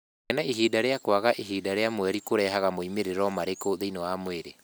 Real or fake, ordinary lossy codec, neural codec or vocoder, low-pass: real; none; none; none